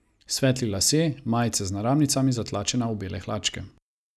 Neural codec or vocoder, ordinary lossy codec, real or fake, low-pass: none; none; real; none